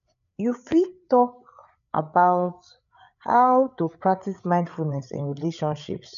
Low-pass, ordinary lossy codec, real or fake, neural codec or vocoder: 7.2 kHz; none; fake; codec, 16 kHz, 8 kbps, FreqCodec, larger model